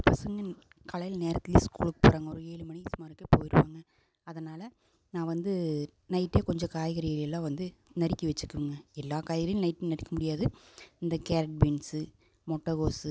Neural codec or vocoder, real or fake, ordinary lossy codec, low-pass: none; real; none; none